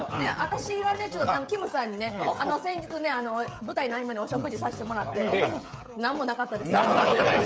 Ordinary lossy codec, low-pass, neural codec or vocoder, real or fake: none; none; codec, 16 kHz, 16 kbps, FreqCodec, smaller model; fake